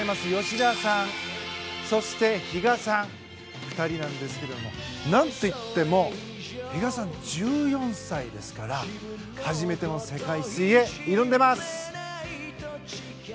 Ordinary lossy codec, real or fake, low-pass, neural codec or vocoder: none; real; none; none